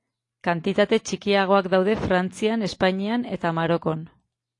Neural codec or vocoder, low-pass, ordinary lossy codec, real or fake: none; 10.8 kHz; AAC, 48 kbps; real